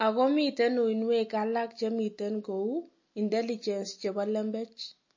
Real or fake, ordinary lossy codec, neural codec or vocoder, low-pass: real; MP3, 32 kbps; none; 7.2 kHz